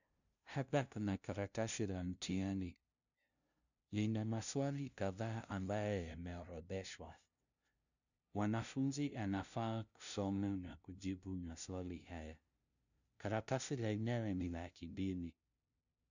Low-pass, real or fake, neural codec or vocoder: 7.2 kHz; fake; codec, 16 kHz, 0.5 kbps, FunCodec, trained on LibriTTS, 25 frames a second